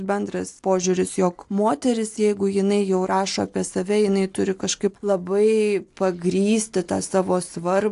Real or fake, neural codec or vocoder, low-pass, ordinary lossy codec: real; none; 10.8 kHz; AAC, 64 kbps